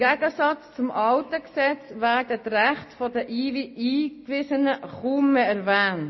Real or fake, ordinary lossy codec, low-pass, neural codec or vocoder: real; MP3, 24 kbps; 7.2 kHz; none